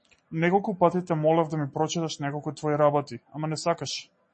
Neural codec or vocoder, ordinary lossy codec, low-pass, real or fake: none; MP3, 32 kbps; 10.8 kHz; real